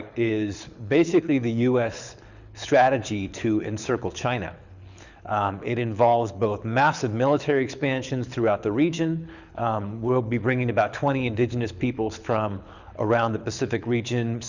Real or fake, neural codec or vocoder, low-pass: fake; codec, 24 kHz, 6 kbps, HILCodec; 7.2 kHz